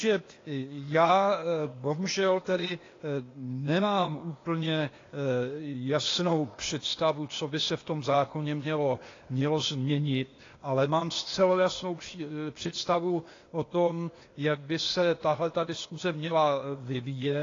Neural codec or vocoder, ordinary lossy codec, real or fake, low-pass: codec, 16 kHz, 0.8 kbps, ZipCodec; AAC, 32 kbps; fake; 7.2 kHz